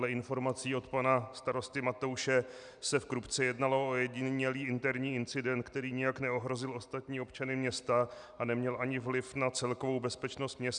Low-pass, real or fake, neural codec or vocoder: 9.9 kHz; real; none